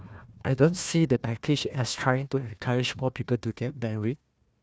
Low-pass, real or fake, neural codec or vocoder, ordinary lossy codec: none; fake; codec, 16 kHz, 1 kbps, FunCodec, trained on Chinese and English, 50 frames a second; none